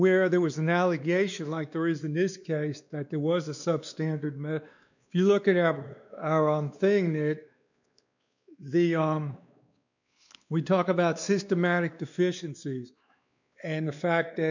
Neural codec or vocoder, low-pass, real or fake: codec, 16 kHz, 2 kbps, X-Codec, WavLM features, trained on Multilingual LibriSpeech; 7.2 kHz; fake